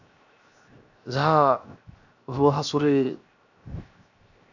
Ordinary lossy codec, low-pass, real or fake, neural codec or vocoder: none; 7.2 kHz; fake; codec, 16 kHz, 0.7 kbps, FocalCodec